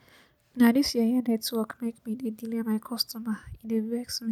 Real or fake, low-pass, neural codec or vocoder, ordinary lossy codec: real; 19.8 kHz; none; none